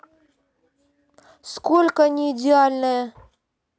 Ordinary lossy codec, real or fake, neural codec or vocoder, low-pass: none; real; none; none